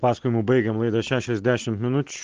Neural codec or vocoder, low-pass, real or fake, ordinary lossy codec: none; 7.2 kHz; real; Opus, 16 kbps